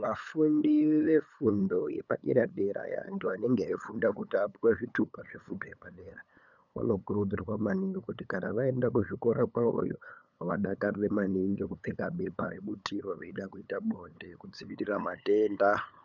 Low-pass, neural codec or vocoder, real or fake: 7.2 kHz; codec, 16 kHz, 8 kbps, FunCodec, trained on LibriTTS, 25 frames a second; fake